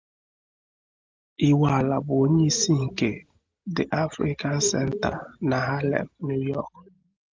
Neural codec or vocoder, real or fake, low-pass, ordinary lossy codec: none; real; 7.2 kHz; Opus, 24 kbps